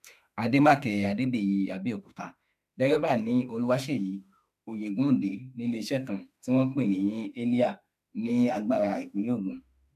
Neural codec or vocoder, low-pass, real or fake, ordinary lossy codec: autoencoder, 48 kHz, 32 numbers a frame, DAC-VAE, trained on Japanese speech; 14.4 kHz; fake; none